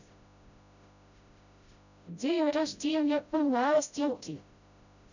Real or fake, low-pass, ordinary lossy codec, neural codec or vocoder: fake; 7.2 kHz; none; codec, 16 kHz, 0.5 kbps, FreqCodec, smaller model